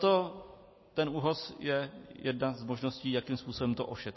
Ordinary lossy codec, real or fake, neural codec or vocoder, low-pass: MP3, 24 kbps; real; none; 7.2 kHz